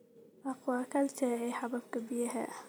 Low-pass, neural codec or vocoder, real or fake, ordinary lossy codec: none; vocoder, 44.1 kHz, 128 mel bands every 256 samples, BigVGAN v2; fake; none